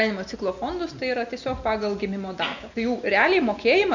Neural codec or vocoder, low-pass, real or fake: none; 7.2 kHz; real